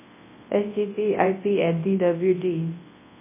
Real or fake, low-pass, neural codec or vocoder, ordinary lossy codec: fake; 3.6 kHz; codec, 24 kHz, 0.9 kbps, WavTokenizer, large speech release; MP3, 16 kbps